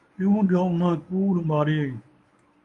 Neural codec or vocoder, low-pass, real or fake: codec, 24 kHz, 0.9 kbps, WavTokenizer, medium speech release version 1; 10.8 kHz; fake